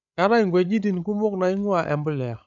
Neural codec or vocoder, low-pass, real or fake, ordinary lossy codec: codec, 16 kHz, 16 kbps, FreqCodec, larger model; 7.2 kHz; fake; none